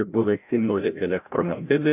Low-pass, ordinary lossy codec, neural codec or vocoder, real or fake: 3.6 kHz; AAC, 32 kbps; codec, 16 kHz, 0.5 kbps, FreqCodec, larger model; fake